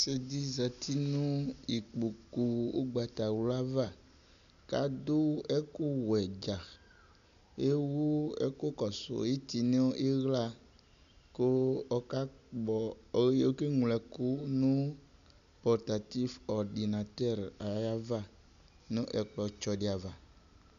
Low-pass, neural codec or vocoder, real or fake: 7.2 kHz; none; real